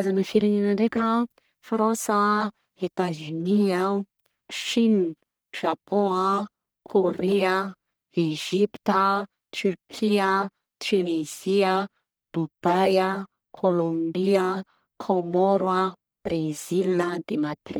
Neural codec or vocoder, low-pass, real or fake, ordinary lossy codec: codec, 44.1 kHz, 1.7 kbps, Pupu-Codec; none; fake; none